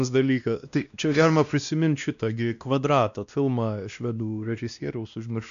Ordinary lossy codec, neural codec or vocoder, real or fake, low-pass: MP3, 96 kbps; codec, 16 kHz, 1 kbps, X-Codec, WavLM features, trained on Multilingual LibriSpeech; fake; 7.2 kHz